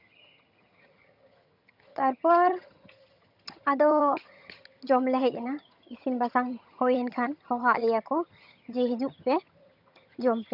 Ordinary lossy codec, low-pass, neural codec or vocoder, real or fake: none; 5.4 kHz; vocoder, 22.05 kHz, 80 mel bands, HiFi-GAN; fake